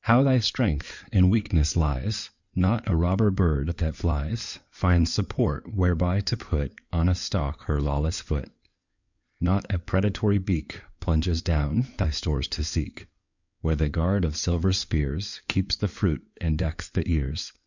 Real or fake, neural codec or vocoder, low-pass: fake; codec, 16 kHz in and 24 kHz out, 2.2 kbps, FireRedTTS-2 codec; 7.2 kHz